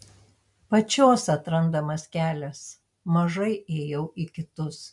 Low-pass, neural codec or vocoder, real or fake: 10.8 kHz; none; real